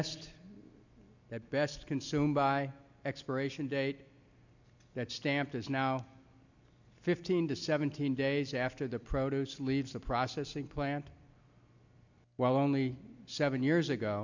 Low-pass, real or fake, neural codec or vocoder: 7.2 kHz; real; none